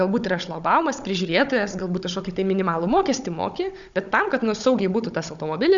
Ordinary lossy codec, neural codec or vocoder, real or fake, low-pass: MP3, 96 kbps; codec, 16 kHz, 16 kbps, FunCodec, trained on Chinese and English, 50 frames a second; fake; 7.2 kHz